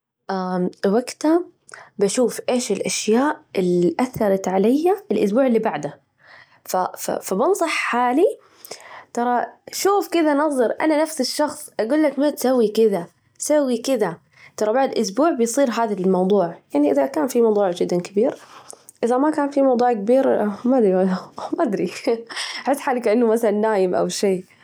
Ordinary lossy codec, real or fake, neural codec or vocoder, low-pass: none; real; none; none